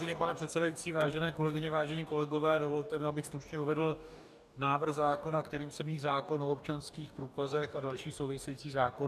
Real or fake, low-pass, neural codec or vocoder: fake; 14.4 kHz; codec, 44.1 kHz, 2.6 kbps, DAC